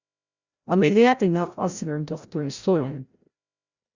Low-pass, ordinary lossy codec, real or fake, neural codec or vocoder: 7.2 kHz; Opus, 64 kbps; fake; codec, 16 kHz, 0.5 kbps, FreqCodec, larger model